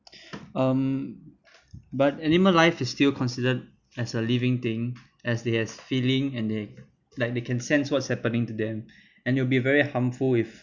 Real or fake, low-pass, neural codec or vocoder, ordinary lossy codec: real; 7.2 kHz; none; none